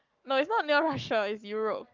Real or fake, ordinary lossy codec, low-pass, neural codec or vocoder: fake; Opus, 32 kbps; 7.2 kHz; codec, 44.1 kHz, 7.8 kbps, Pupu-Codec